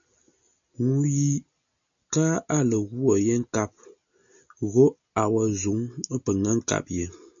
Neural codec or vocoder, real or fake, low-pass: none; real; 7.2 kHz